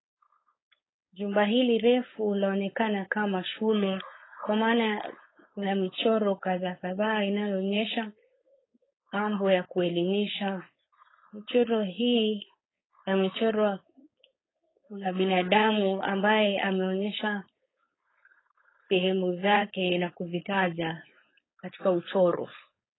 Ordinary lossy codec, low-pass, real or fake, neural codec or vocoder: AAC, 16 kbps; 7.2 kHz; fake; codec, 16 kHz, 4.8 kbps, FACodec